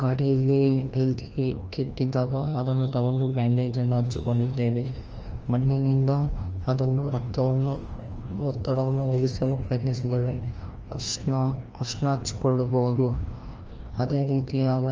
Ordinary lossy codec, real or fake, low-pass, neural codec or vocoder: Opus, 24 kbps; fake; 7.2 kHz; codec, 16 kHz, 1 kbps, FreqCodec, larger model